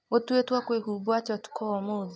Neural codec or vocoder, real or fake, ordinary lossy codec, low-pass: none; real; none; none